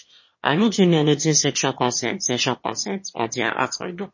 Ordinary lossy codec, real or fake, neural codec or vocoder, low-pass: MP3, 32 kbps; fake; autoencoder, 22.05 kHz, a latent of 192 numbers a frame, VITS, trained on one speaker; 7.2 kHz